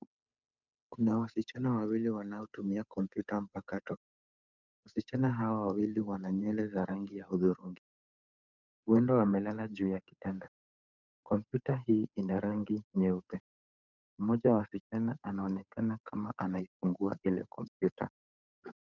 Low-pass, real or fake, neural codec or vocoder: 7.2 kHz; fake; codec, 16 kHz, 8 kbps, FunCodec, trained on Chinese and English, 25 frames a second